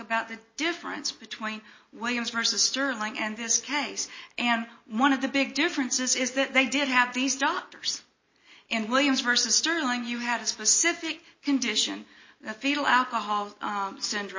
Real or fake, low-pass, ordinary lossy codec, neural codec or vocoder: real; 7.2 kHz; MP3, 32 kbps; none